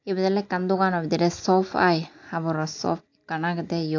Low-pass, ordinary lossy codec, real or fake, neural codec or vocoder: 7.2 kHz; AAC, 48 kbps; real; none